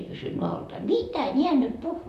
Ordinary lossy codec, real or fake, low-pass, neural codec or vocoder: none; fake; 14.4 kHz; vocoder, 44.1 kHz, 128 mel bands, Pupu-Vocoder